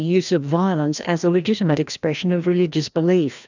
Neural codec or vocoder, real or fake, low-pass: codec, 16 kHz, 1 kbps, FreqCodec, larger model; fake; 7.2 kHz